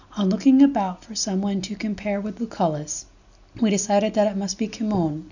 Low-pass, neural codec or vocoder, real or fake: 7.2 kHz; none; real